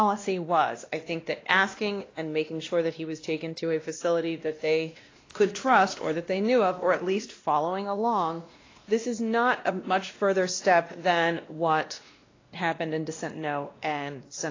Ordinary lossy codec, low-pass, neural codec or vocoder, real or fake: AAC, 32 kbps; 7.2 kHz; codec, 16 kHz, 1 kbps, X-Codec, WavLM features, trained on Multilingual LibriSpeech; fake